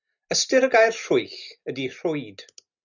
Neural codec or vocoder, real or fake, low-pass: none; real; 7.2 kHz